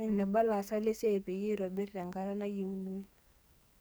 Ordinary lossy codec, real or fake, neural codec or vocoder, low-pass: none; fake; codec, 44.1 kHz, 2.6 kbps, SNAC; none